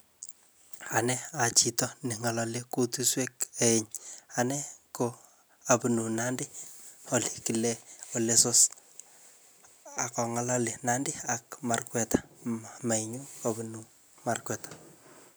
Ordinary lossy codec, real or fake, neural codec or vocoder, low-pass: none; real; none; none